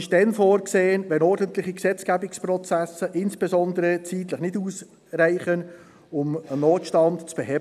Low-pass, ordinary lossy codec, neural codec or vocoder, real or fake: 14.4 kHz; none; none; real